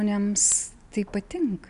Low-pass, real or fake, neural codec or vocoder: 10.8 kHz; real; none